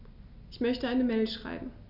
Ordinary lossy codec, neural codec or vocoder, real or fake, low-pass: none; none; real; 5.4 kHz